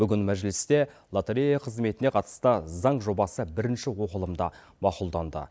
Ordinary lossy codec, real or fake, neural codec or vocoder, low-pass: none; real; none; none